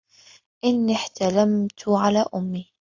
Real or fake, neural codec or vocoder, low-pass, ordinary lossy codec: real; none; 7.2 kHz; AAC, 48 kbps